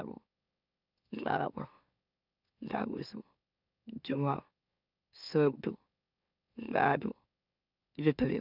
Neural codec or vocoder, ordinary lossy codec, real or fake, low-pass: autoencoder, 44.1 kHz, a latent of 192 numbers a frame, MeloTTS; none; fake; 5.4 kHz